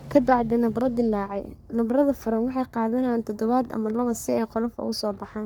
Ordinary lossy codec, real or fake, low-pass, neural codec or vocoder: none; fake; none; codec, 44.1 kHz, 3.4 kbps, Pupu-Codec